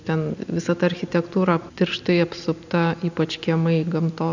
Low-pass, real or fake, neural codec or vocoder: 7.2 kHz; real; none